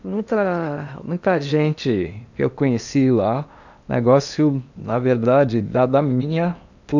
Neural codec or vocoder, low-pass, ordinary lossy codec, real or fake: codec, 16 kHz in and 24 kHz out, 0.8 kbps, FocalCodec, streaming, 65536 codes; 7.2 kHz; none; fake